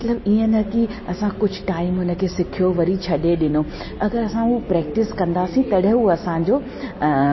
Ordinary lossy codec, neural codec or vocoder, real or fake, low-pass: MP3, 24 kbps; none; real; 7.2 kHz